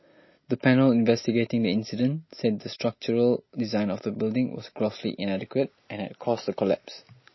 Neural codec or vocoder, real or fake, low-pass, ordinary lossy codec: none; real; 7.2 kHz; MP3, 24 kbps